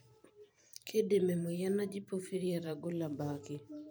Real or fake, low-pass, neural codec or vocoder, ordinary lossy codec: fake; none; vocoder, 44.1 kHz, 128 mel bands every 512 samples, BigVGAN v2; none